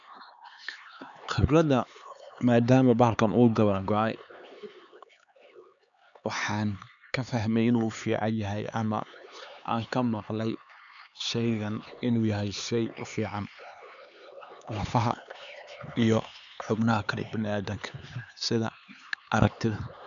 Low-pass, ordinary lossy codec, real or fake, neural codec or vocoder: 7.2 kHz; none; fake; codec, 16 kHz, 4 kbps, X-Codec, HuBERT features, trained on LibriSpeech